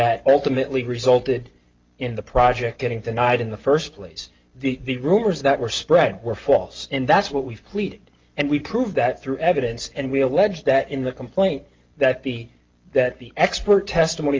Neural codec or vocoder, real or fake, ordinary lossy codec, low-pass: none; real; Opus, 32 kbps; 7.2 kHz